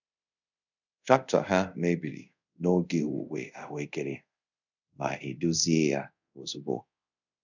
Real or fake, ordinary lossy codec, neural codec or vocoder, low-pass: fake; none; codec, 24 kHz, 0.5 kbps, DualCodec; 7.2 kHz